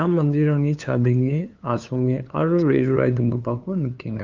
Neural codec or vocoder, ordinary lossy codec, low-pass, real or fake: codec, 16 kHz, 2 kbps, FunCodec, trained on LibriTTS, 25 frames a second; Opus, 24 kbps; 7.2 kHz; fake